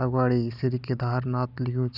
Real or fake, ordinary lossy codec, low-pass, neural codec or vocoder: real; none; 5.4 kHz; none